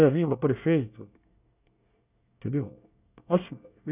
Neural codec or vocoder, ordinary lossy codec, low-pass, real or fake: codec, 24 kHz, 1 kbps, SNAC; none; 3.6 kHz; fake